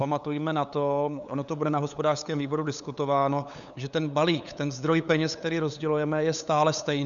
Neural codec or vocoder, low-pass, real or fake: codec, 16 kHz, 8 kbps, FunCodec, trained on LibriTTS, 25 frames a second; 7.2 kHz; fake